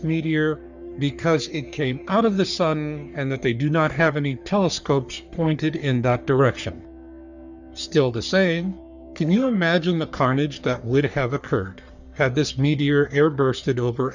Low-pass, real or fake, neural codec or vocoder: 7.2 kHz; fake; codec, 44.1 kHz, 3.4 kbps, Pupu-Codec